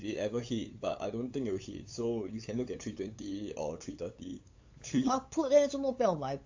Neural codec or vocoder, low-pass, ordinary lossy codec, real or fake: codec, 16 kHz, 8 kbps, FunCodec, trained on LibriTTS, 25 frames a second; 7.2 kHz; AAC, 48 kbps; fake